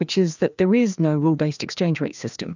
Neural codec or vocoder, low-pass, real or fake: codec, 16 kHz, 2 kbps, FreqCodec, larger model; 7.2 kHz; fake